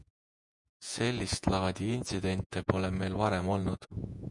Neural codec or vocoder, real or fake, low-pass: vocoder, 48 kHz, 128 mel bands, Vocos; fake; 10.8 kHz